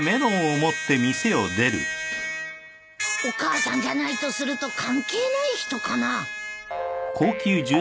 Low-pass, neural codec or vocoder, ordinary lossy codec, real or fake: none; none; none; real